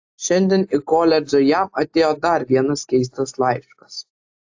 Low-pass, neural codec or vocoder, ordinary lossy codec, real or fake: 7.2 kHz; none; AAC, 48 kbps; real